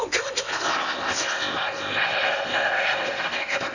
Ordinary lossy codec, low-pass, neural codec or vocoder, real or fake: none; 7.2 kHz; codec, 16 kHz in and 24 kHz out, 0.8 kbps, FocalCodec, streaming, 65536 codes; fake